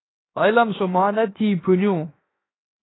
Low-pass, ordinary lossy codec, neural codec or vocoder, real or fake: 7.2 kHz; AAC, 16 kbps; codec, 16 kHz, 0.7 kbps, FocalCodec; fake